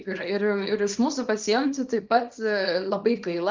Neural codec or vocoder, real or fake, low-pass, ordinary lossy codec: codec, 24 kHz, 0.9 kbps, WavTokenizer, small release; fake; 7.2 kHz; Opus, 32 kbps